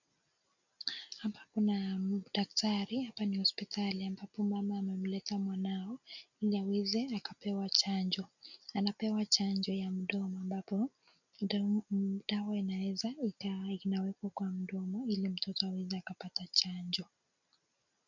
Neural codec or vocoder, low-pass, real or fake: none; 7.2 kHz; real